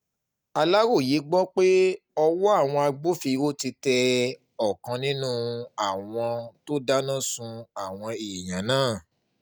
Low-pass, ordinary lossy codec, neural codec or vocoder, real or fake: none; none; none; real